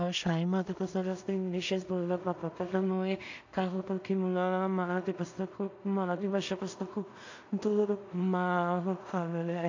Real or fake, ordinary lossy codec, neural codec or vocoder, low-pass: fake; AAC, 48 kbps; codec, 16 kHz in and 24 kHz out, 0.4 kbps, LongCat-Audio-Codec, two codebook decoder; 7.2 kHz